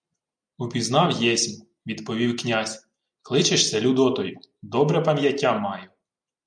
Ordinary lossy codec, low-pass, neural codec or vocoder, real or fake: MP3, 96 kbps; 9.9 kHz; none; real